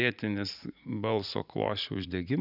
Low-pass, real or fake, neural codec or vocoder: 5.4 kHz; fake; vocoder, 44.1 kHz, 80 mel bands, Vocos